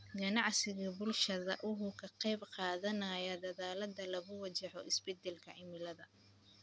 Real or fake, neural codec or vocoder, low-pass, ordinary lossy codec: real; none; none; none